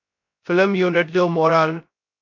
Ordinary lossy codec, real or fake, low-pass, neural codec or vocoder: MP3, 48 kbps; fake; 7.2 kHz; codec, 16 kHz, 0.3 kbps, FocalCodec